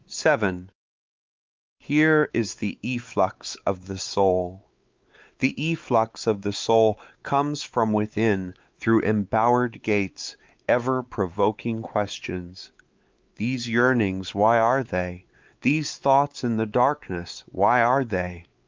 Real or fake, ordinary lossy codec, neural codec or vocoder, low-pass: fake; Opus, 24 kbps; codec, 16 kHz, 4 kbps, X-Codec, WavLM features, trained on Multilingual LibriSpeech; 7.2 kHz